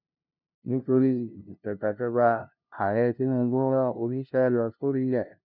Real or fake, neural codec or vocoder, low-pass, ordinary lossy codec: fake; codec, 16 kHz, 0.5 kbps, FunCodec, trained on LibriTTS, 25 frames a second; 5.4 kHz; none